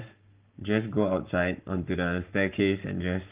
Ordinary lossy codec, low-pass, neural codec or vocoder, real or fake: Opus, 32 kbps; 3.6 kHz; codec, 44.1 kHz, 7.8 kbps, Pupu-Codec; fake